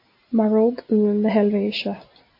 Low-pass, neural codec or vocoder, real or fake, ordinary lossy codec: 5.4 kHz; none; real; MP3, 32 kbps